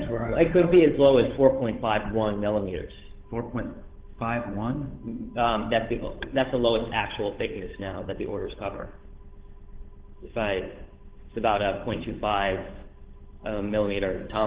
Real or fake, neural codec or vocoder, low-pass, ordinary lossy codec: fake; codec, 16 kHz, 8 kbps, FunCodec, trained on Chinese and English, 25 frames a second; 3.6 kHz; Opus, 16 kbps